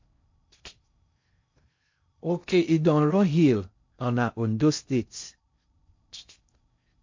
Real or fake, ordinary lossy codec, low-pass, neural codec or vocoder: fake; MP3, 48 kbps; 7.2 kHz; codec, 16 kHz in and 24 kHz out, 0.6 kbps, FocalCodec, streaming, 4096 codes